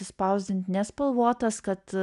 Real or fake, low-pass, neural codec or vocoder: real; 10.8 kHz; none